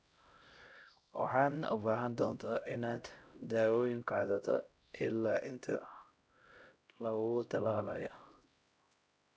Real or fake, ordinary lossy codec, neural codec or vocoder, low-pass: fake; none; codec, 16 kHz, 0.5 kbps, X-Codec, HuBERT features, trained on LibriSpeech; none